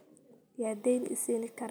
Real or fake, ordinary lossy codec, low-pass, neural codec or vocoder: real; none; none; none